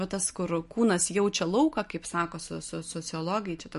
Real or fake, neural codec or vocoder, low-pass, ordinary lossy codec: real; none; 14.4 kHz; MP3, 48 kbps